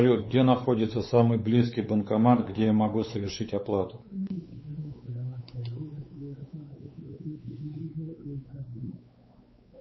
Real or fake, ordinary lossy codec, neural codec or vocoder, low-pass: fake; MP3, 24 kbps; codec, 16 kHz, 4 kbps, X-Codec, WavLM features, trained on Multilingual LibriSpeech; 7.2 kHz